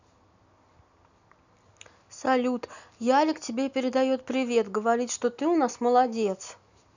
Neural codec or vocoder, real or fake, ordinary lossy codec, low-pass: none; real; none; 7.2 kHz